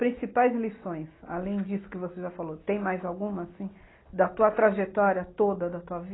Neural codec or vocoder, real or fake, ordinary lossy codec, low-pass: none; real; AAC, 16 kbps; 7.2 kHz